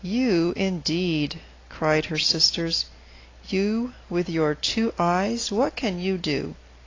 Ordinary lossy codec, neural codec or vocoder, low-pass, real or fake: AAC, 32 kbps; none; 7.2 kHz; real